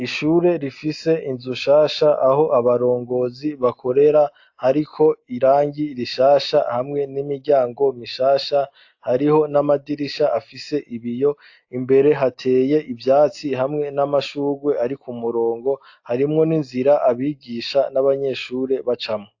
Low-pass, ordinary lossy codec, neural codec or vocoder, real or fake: 7.2 kHz; AAC, 48 kbps; none; real